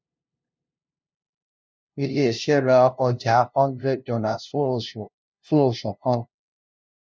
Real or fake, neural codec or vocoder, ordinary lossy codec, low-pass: fake; codec, 16 kHz, 0.5 kbps, FunCodec, trained on LibriTTS, 25 frames a second; none; none